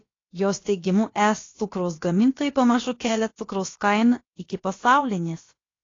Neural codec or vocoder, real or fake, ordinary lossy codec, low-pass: codec, 16 kHz, about 1 kbps, DyCAST, with the encoder's durations; fake; AAC, 32 kbps; 7.2 kHz